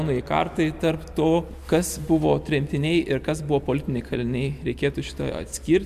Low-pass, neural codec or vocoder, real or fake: 14.4 kHz; none; real